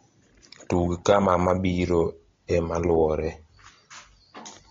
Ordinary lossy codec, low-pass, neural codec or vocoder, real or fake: AAC, 32 kbps; 7.2 kHz; none; real